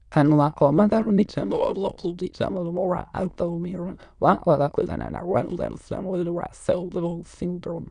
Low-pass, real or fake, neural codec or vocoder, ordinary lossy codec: 9.9 kHz; fake; autoencoder, 22.05 kHz, a latent of 192 numbers a frame, VITS, trained on many speakers; none